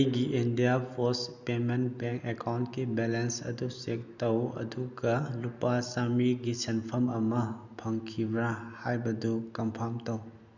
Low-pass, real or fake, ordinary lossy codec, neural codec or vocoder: 7.2 kHz; real; none; none